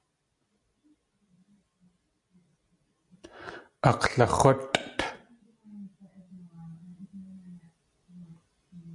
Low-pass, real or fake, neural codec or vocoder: 10.8 kHz; real; none